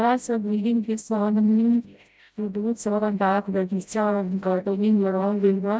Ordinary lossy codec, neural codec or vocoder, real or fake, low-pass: none; codec, 16 kHz, 0.5 kbps, FreqCodec, smaller model; fake; none